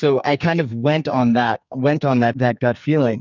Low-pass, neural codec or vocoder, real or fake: 7.2 kHz; codec, 44.1 kHz, 2.6 kbps, SNAC; fake